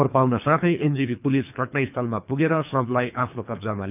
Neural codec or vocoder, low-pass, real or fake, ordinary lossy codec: codec, 24 kHz, 3 kbps, HILCodec; 3.6 kHz; fake; none